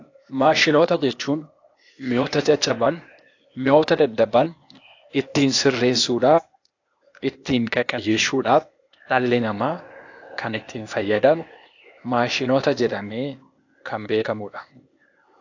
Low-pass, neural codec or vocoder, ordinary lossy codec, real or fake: 7.2 kHz; codec, 16 kHz, 0.8 kbps, ZipCodec; AAC, 48 kbps; fake